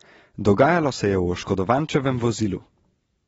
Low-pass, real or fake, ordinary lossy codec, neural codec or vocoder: 10.8 kHz; real; AAC, 24 kbps; none